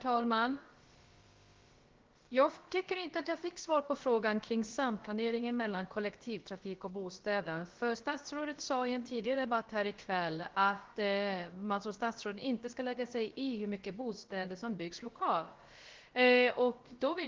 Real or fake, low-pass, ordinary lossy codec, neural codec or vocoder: fake; 7.2 kHz; Opus, 16 kbps; codec, 16 kHz, about 1 kbps, DyCAST, with the encoder's durations